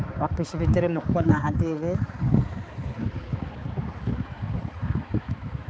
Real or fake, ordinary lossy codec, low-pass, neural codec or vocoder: fake; none; none; codec, 16 kHz, 4 kbps, X-Codec, HuBERT features, trained on balanced general audio